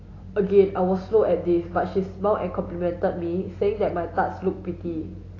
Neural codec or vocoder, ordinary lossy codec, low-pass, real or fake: none; AAC, 32 kbps; 7.2 kHz; real